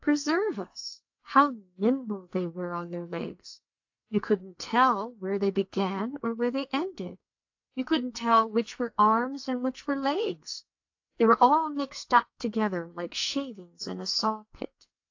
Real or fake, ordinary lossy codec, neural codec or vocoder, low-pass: fake; AAC, 48 kbps; codec, 44.1 kHz, 2.6 kbps, SNAC; 7.2 kHz